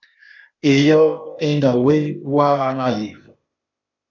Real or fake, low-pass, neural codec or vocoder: fake; 7.2 kHz; codec, 16 kHz, 0.8 kbps, ZipCodec